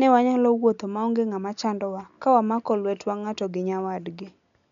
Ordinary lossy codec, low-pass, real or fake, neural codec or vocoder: none; 7.2 kHz; real; none